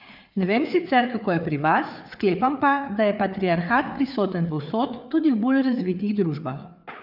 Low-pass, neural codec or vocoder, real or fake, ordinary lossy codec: 5.4 kHz; codec, 16 kHz, 4 kbps, FreqCodec, larger model; fake; none